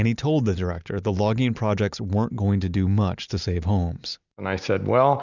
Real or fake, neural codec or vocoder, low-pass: real; none; 7.2 kHz